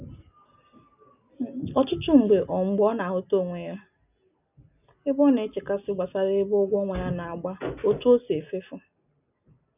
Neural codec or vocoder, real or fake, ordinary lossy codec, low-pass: none; real; none; 3.6 kHz